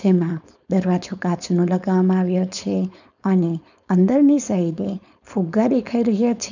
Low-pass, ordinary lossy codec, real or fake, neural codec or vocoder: 7.2 kHz; none; fake; codec, 16 kHz, 4.8 kbps, FACodec